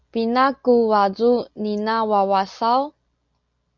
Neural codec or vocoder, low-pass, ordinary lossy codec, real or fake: none; 7.2 kHz; Opus, 64 kbps; real